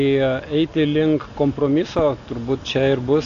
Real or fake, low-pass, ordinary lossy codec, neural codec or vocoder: real; 7.2 kHz; AAC, 48 kbps; none